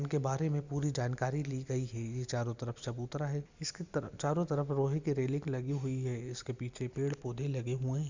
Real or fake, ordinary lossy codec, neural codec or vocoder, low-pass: real; Opus, 64 kbps; none; 7.2 kHz